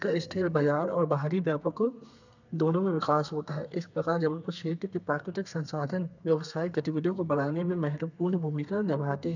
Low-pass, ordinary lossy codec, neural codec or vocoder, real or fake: 7.2 kHz; none; codec, 32 kHz, 1.9 kbps, SNAC; fake